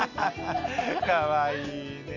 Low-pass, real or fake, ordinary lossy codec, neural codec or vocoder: 7.2 kHz; real; AAC, 48 kbps; none